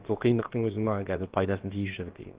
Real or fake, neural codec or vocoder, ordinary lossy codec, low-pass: fake; codec, 16 kHz, about 1 kbps, DyCAST, with the encoder's durations; Opus, 16 kbps; 3.6 kHz